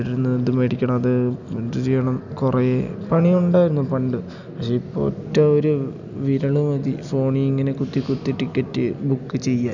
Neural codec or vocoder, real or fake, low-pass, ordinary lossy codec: none; real; 7.2 kHz; none